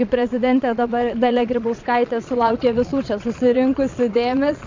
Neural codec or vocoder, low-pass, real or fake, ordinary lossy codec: vocoder, 22.05 kHz, 80 mel bands, Vocos; 7.2 kHz; fake; MP3, 64 kbps